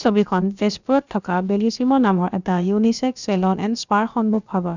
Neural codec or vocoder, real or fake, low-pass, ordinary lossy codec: codec, 16 kHz, about 1 kbps, DyCAST, with the encoder's durations; fake; 7.2 kHz; none